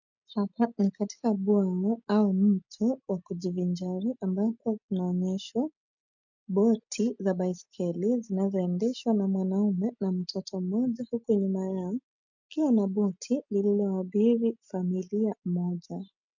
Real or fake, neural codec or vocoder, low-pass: real; none; 7.2 kHz